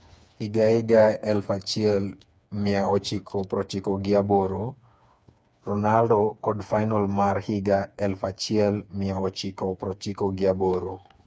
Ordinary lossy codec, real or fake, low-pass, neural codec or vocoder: none; fake; none; codec, 16 kHz, 4 kbps, FreqCodec, smaller model